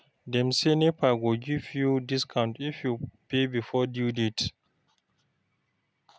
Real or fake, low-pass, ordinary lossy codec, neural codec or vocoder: real; none; none; none